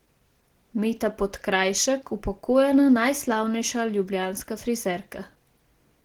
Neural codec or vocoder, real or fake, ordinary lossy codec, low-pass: none; real; Opus, 16 kbps; 19.8 kHz